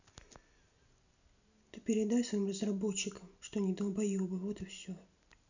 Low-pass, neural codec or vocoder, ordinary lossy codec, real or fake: 7.2 kHz; none; none; real